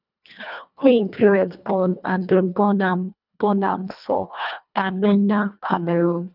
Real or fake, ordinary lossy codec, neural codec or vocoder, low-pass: fake; none; codec, 24 kHz, 1.5 kbps, HILCodec; 5.4 kHz